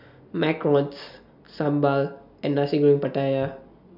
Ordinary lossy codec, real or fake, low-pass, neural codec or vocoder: none; real; 5.4 kHz; none